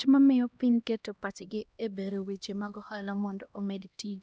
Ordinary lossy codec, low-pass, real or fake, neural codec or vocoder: none; none; fake; codec, 16 kHz, 1 kbps, X-Codec, HuBERT features, trained on LibriSpeech